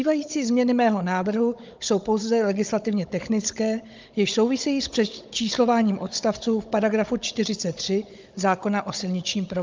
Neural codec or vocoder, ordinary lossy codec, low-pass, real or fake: codec, 16 kHz, 16 kbps, FunCodec, trained on Chinese and English, 50 frames a second; Opus, 24 kbps; 7.2 kHz; fake